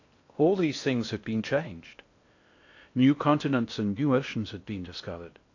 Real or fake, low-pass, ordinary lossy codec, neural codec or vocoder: fake; 7.2 kHz; AAC, 48 kbps; codec, 16 kHz in and 24 kHz out, 0.6 kbps, FocalCodec, streaming, 4096 codes